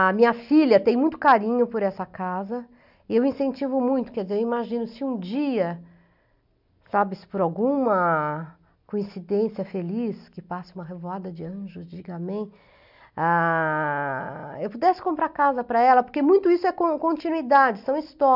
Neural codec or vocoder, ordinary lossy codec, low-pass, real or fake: none; none; 5.4 kHz; real